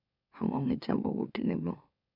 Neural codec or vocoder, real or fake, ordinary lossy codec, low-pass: autoencoder, 44.1 kHz, a latent of 192 numbers a frame, MeloTTS; fake; none; 5.4 kHz